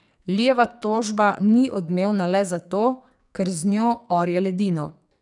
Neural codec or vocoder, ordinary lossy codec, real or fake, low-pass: codec, 44.1 kHz, 2.6 kbps, SNAC; none; fake; 10.8 kHz